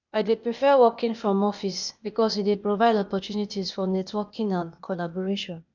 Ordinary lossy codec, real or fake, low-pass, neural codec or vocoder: none; fake; 7.2 kHz; codec, 16 kHz, 0.8 kbps, ZipCodec